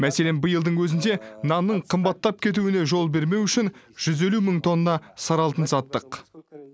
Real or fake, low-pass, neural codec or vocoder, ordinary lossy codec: real; none; none; none